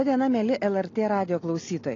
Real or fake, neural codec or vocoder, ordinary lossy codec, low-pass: real; none; AAC, 32 kbps; 7.2 kHz